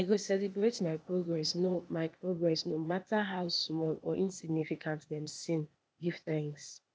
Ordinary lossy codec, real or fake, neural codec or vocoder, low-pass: none; fake; codec, 16 kHz, 0.8 kbps, ZipCodec; none